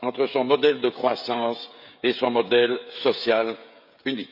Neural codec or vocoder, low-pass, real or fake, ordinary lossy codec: codec, 16 kHz, 16 kbps, FreqCodec, smaller model; 5.4 kHz; fake; none